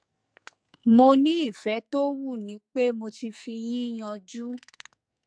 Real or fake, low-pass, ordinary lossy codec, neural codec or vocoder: fake; 9.9 kHz; AAC, 64 kbps; codec, 44.1 kHz, 2.6 kbps, SNAC